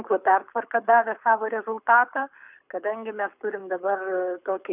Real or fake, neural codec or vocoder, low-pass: fake; codec, 24 kHz, 6 kbps, HILCodec; 3.6 kHz